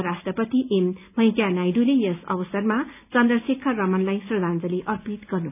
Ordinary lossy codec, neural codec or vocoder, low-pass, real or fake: none; none; 3.6 kHz; real